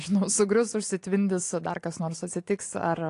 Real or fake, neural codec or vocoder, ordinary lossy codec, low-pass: real; none; AAC, 48 kbps; 10.8 kHz